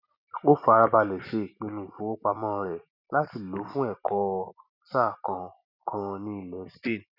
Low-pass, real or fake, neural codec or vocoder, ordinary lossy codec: 5.4 kHz; real; none; none